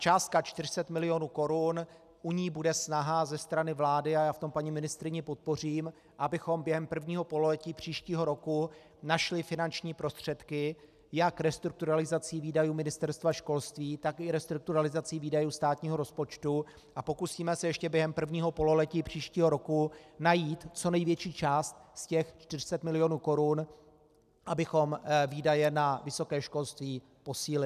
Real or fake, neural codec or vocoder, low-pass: real; none; 14.4 kHz